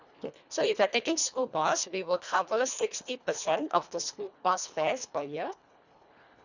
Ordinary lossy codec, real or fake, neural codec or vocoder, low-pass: none; fake; codec, 24 kHz, 1.5 kbps, HILCodec; 7.2 kHz